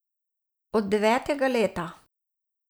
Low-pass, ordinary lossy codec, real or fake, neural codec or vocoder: none; none; real; none